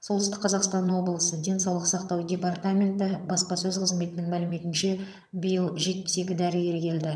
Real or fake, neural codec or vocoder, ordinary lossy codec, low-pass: fake; vocoder, 22.05 kHz, 80 mel bands, HiFi-GAN; none; none